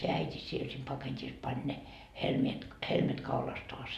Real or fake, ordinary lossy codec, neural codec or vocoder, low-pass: real; MP3, 96 kbps; none; 14.4 kHz